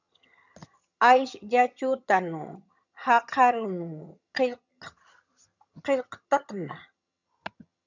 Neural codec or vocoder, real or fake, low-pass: vocoder, 22.05 kHz, 80 mel bands, HiFi-GAN; fake; 7.2 kHz